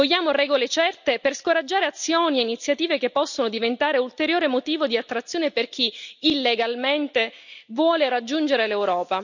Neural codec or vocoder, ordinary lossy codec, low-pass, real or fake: none; none; 7.2 kHz; real